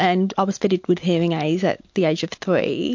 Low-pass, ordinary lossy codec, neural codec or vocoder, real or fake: 7.2 kHz; MP3, 48 kbps; none; real